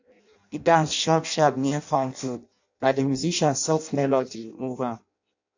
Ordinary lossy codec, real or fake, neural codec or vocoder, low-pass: AAC, 48 kbps; fake; codec, 16 kHz in and 24 kHz out, 0.6 kbps, FireRedTTS-2 codec; 7.2 kHz